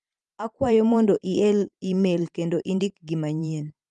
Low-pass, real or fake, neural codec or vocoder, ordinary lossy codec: 10.8 kHz; fake; vocoder, 44.1 kHz, 128 mel bands every 512 samples, BigVGAN v2; Opus, 32 kbps